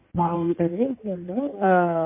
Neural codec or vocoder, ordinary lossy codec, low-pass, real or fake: codec, 16 kHz in and 24 kHz out, 1.1 kbps, FireRedTTS-2 codec; MP3, 24 kbps; 3.6 kHz; fake